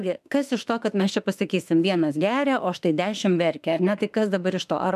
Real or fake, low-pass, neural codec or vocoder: fake; 14.4 kHz; autoencoder, 48 kHz, 32 numbers a frame, DAC-VAE, trained on Japanese speech